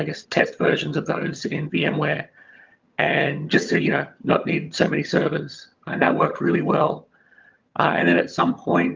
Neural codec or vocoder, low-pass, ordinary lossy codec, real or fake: vocoder, 22.05 kHz, 80 mel bands, HiFi-GAN; 7.2 kHz; Opus, 24 kbps; fake